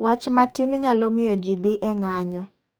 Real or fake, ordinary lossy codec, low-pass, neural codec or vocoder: fake; none; none; codec, 44.1 kHz, 2.6 kbps, DAC